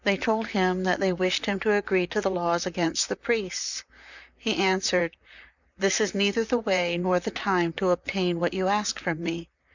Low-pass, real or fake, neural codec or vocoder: 7.2 kHz; fake; vocoder, 44.1 kHz, 128 mel bands, Pupu-Vocoder